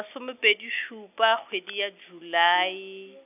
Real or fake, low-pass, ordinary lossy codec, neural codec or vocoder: real; 3.6 kHz; none; none